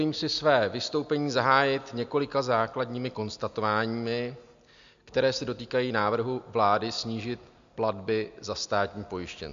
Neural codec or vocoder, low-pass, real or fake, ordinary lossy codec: none; 7.2 kHz; real; MP3, 64 kbps